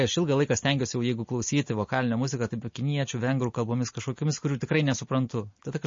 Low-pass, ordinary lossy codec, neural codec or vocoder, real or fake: 7.2 kHz; MP3, 32 kbps; none; real